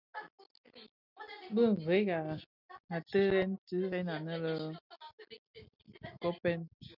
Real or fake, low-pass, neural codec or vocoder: real; 5.4 kHz; none